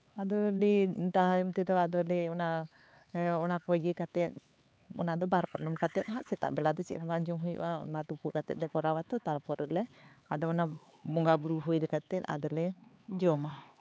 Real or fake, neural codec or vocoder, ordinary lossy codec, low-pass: fake; codec, 16 kHz, 4 kbps, X-Codec, HuBERT features, trained on LibriSpeech; none; none